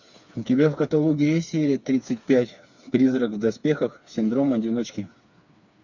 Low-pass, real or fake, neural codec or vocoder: 7.2 kHz; fake; codec, 16 kHz, 4 kbps, FreqCodec, smaller model